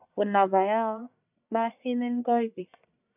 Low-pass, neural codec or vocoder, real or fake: 3.6 kHz; codec, 44.1 kHz, 1.7 kbps, Pupu-Codec; fake